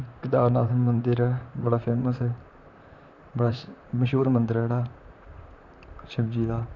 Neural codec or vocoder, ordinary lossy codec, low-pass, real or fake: vocoder, 44.1 kHz, 128 mel bands, Pupu-Vocoder; none; 7.2 kHz; fake